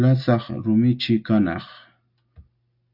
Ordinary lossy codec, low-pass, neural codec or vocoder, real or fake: AAC, 48 kbps; 5.4 kHz; none; real